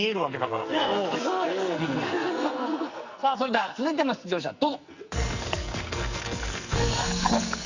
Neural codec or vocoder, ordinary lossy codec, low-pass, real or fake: codec, 44.1 kHz, 2.6 kbps, SNAC; Opus, 64 kbps; 7.2 kHz; fake